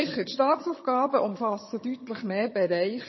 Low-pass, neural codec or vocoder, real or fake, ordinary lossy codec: 7.2 kHz; vocoder, 22.05 kHz, 80 mel bands, HiFi-GAN; fake; MP3, 24 kbps